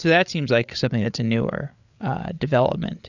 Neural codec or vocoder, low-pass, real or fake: codec, 16 kHz, 8 kbps, FreqCodec, larger model; 7.2 kHz; fake